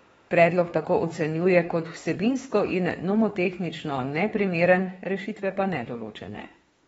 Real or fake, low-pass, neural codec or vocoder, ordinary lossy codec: fake; 19.8 kHz; autoencoder, 48 kHz, 32 numbers a frame, DAC-VAE, trained on Japanese speech; AAC, 24 kbps